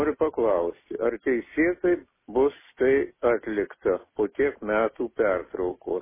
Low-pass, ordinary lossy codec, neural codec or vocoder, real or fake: 3.6 kHz; MP3, 16 kbps; none; real